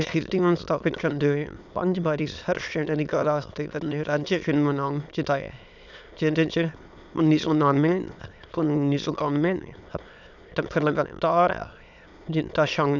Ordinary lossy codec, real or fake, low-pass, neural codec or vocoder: none; fake; 7.2 kHz; autoencoder, 22.05 kHz, a latent of 192 numbers a frame, VITS, trained on many speakers